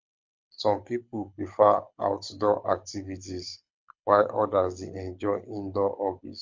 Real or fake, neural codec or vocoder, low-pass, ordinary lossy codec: fake; codec, 24 kHz, 6 kbps, HILCodec; 7.2 kHz; MP3, 48 kbps